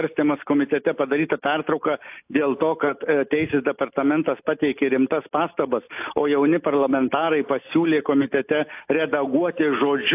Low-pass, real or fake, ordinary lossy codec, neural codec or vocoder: 3.6 kHz; real; AAC, 32 kbps; none